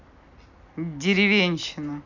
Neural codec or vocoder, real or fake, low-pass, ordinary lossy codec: none; real; 7.2 kHz; none